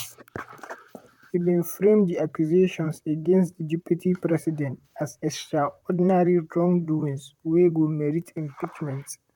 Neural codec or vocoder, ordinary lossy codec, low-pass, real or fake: vocoder, 44.1 kHz, 128 mel bands, Pupu-Vocoder; MP3, 96 kbps; 19.8 kHz; fake